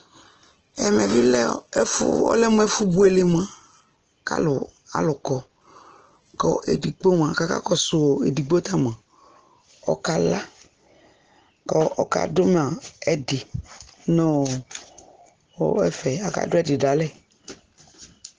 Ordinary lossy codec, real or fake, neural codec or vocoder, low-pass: Opus, 16 kbps; real; none; 7.2 kHz